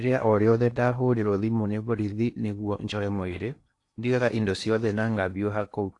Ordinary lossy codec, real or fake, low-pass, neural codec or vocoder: AAC, 48 kbps; fake; 10.8 kHz; codec, 16 kHz in and 24 kHz out, 0.8 kbps, FocalCodec, streaming, 65536 codes